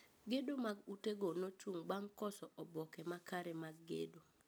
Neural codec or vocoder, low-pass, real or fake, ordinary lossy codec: none; none; real; none